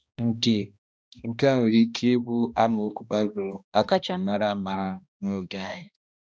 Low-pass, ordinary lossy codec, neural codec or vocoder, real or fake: none; none; codec, 16 kHz, 1 kbps, X-Codec, HuBERT features, trained on balanced general audio; fake